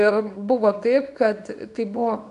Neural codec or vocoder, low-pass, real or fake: codec, 24 kHz, 0.9 kbps, WavTokenizer, small release; 10.8 kHz; fake